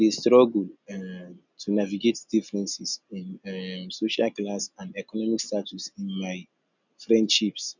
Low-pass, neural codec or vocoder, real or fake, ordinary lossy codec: 7.2 kHz; none; real; none